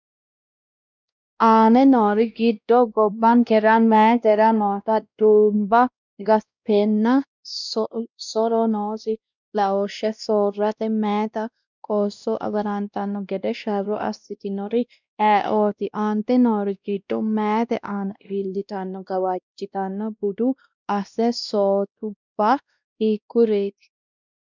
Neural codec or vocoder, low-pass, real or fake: codec, 16 kHz, 1 kbps, X-Codec, WavLM features, trained on Multilingual LibriSpeech; 7.2 kHz; fake